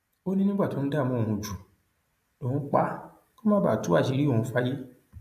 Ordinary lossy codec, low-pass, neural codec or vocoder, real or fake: AAC, 96 kbps; 14.4 kHz; none; real